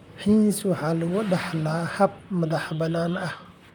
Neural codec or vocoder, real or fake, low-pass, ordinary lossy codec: vocoder, 44.1 kHz, 128 mel bands, Pupu-Vocoder; fake; 19.8 kHz; none